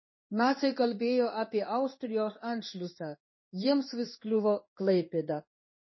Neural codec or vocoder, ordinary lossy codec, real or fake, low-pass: codec, 16 kHz in and 24 kHz out, 1 kbps, XY-Tokenizer; MP3, 24 kbps; fake; 7.2 kHz